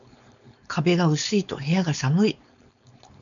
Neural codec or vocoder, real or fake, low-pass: codec, 16 kHz, 4.8 kbps, FACodec; fake; 7.2 kHz